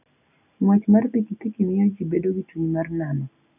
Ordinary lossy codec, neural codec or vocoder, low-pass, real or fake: none; none; 3.6 kHz; real